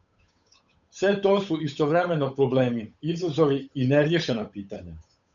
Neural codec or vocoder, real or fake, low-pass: codec, 16 kHz, 8 kbps, FunCodec, trained on Chinese and English, 25 frames a second; fake; 7.2 kHz